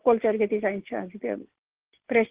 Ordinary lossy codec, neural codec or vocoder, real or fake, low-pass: Opus, 16 kbps; none; real; 3.6 kHz